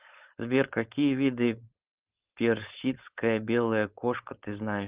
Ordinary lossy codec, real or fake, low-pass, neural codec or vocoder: Opus, 32 kbps; fake; 3.6 kHz; codec, 16 kHz, 4.8 kbps, FACodec